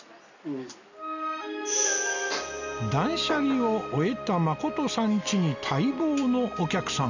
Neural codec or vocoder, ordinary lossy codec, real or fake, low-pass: none; none; real; 7.2 kHz